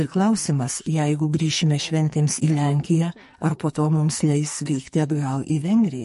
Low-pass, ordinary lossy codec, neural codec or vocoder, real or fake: 14.4 kHz; MP3, 48 kbps; codec, 44.1 kHz, 2.6 kbps, SNAC; fake